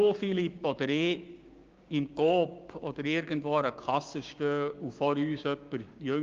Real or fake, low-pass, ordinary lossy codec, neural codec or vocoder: fake; 7.2 kHz; Opus, 24 kbps; codec, 16 kHz, 6 kbps, DAC